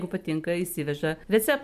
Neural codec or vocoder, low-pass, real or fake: none; 14.4 kHz; real